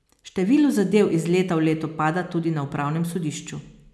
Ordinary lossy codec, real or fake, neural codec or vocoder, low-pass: none; real; none; none